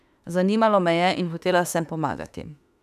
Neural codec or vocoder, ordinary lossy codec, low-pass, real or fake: autoencoder, 48 kHz, 32 numbers a frame, DAC-VAE, trained on Japanese speech; none; 14.4 kHz; fake